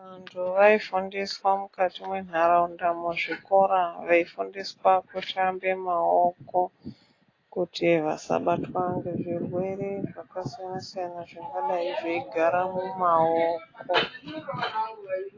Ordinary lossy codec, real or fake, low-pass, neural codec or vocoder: AAC, 32 kbps; real; 7.2 kHz; none